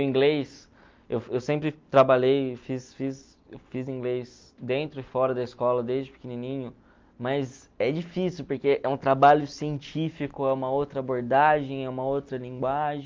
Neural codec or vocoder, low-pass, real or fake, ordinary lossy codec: none; 7.2 kHz; real; Opus, 24 kbps